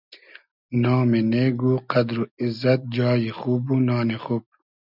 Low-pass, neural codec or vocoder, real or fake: 5.4 kHz; none; real